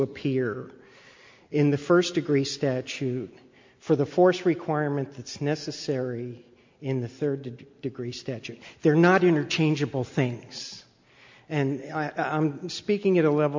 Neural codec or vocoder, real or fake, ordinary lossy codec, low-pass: none; real; MP3, 48 kbps; 7.2 kHz